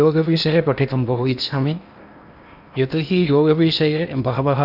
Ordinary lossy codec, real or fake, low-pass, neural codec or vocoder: none; fake; 5.4 kHz; codec, 16 kHz in and 24 kHz out, 0.8 kbps, FocalCodec, streaming, 65536 codes